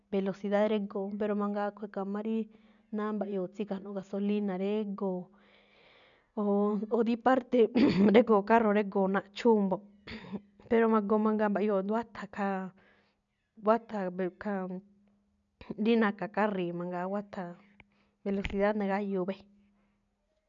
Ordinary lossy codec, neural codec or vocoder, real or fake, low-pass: none; none; real; 7.2 kHz